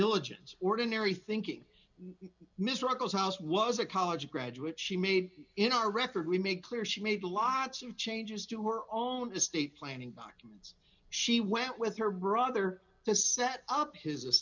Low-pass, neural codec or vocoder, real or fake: 7.2 kHz; none; real